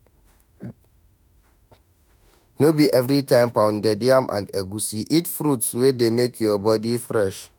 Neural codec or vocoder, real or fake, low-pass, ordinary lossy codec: autoencoder, 48 kHz, 32 numbers a frame, DAC-VAE, trained on Japanese speech; fake; none; none